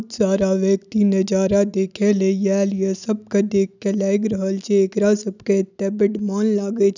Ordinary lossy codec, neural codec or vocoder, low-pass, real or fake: none; none; 7.2 kHz; real